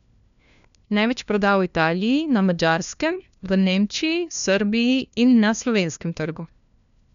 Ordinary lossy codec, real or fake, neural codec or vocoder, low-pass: none; fake; codec, 16 kHz, 1 kbps, FunCodec, trained on LibriTTS, 50 frames a second; 7.2 kHz